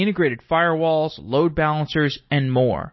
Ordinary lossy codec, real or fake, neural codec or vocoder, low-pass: MP3, 24 kbps; real; none; 7.2 kHz